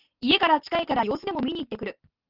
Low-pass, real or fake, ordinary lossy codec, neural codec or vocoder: 5.4 kHz; real; Opus, 32 kbps; none